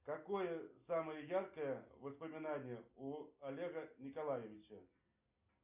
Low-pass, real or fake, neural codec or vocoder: 3.6 kHz; real; none